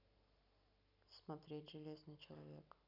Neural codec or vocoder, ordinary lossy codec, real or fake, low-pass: none; none; real; 5.4 kHz